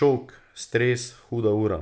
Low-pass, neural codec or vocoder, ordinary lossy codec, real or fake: none; none; none; real